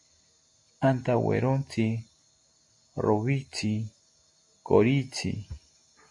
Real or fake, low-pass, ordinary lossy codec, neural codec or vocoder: real; 10.8 kHz; MP3, 48 kbps; none